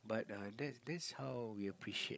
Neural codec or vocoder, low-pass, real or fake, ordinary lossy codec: codec, 16 kHz, 16 kbps, FreqCodec, larger model; none; fake; none